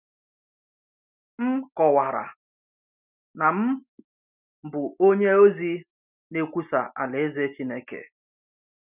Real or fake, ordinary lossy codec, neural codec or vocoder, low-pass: real; none; none; 3.6 kHz